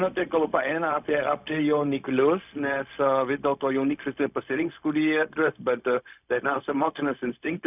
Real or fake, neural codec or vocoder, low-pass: fake; codec, 16 kHz, 0.4 kbps, LongCat-Audio-Codec; 3.6 kHz